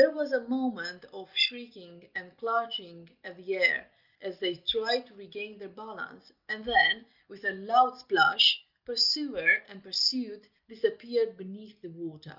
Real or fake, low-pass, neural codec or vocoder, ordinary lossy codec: real; 5.4 kHz; none; Opus, 24 kbps